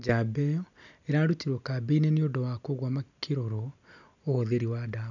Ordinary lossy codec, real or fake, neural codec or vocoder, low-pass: none; real; none; 7.2 kHz